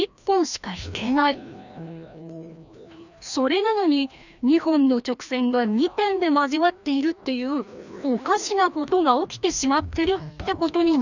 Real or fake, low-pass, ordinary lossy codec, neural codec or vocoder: fake; 7.2 kHz; none; codec, 16 kHz, 1 kbps, FreqCodec, larger model